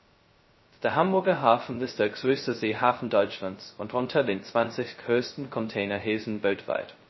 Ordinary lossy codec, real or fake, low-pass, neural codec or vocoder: MP3, 24 kbps; fake; 7.2 kHz; codec, 16 kHz, 0.2 kbps, FocalCodec